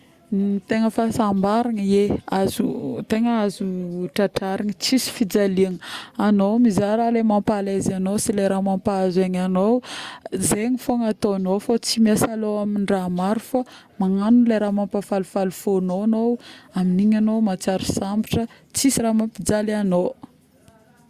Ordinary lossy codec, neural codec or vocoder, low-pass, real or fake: Opus, 64 kbps; none; 14.4 kHz; real